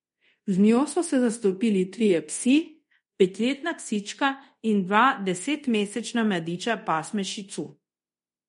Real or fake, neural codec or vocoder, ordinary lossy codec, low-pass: fake; codec, 24 kHz, 0.5 kbps, DualCodec; MP3, 48 kbps; 10.8 kHz